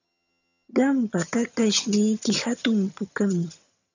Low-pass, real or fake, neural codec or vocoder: 7.2 kHz; fake; vocoder, 22.05 kHz, 80 mel bands, HiFi-GAN